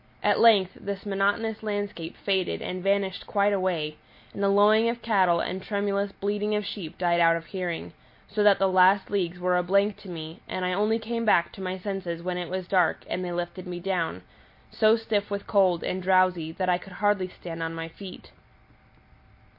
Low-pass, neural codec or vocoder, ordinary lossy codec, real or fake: 5.4 kHz; none; MP3, 32 kbps; real